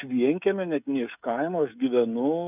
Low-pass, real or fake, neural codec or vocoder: 3.6 kHz; fake; codec, 16 kHz, 16 kbps, FreqCodec, smaller model